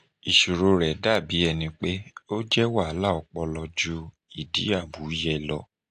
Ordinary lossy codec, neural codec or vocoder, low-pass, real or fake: AAC, 48 kbps; none; 9.9 kHz; real